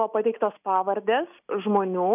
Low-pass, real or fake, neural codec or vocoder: 3.6 kHz; real; none